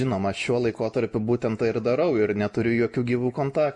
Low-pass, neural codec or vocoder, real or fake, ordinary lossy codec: 10.8 kHz; none; real; MP3, 48 kbps